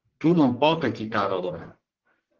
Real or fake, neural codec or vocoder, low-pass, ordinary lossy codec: fake; codec, 44.1 kHz, 1.7 kbps, Pupu-Codec; 7.2 kHz; Opus, 16 kbps